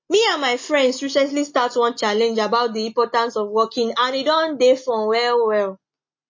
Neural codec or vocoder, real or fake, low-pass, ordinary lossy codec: none; real; 7.2 kHz; MP3, 32 kbps